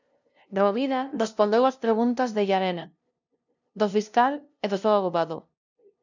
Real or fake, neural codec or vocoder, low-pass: fake; codec, 16 kHz, 0.5 kbps, FunCodec, trained on LibriTTS, 25 frames a second; 7.2 kHz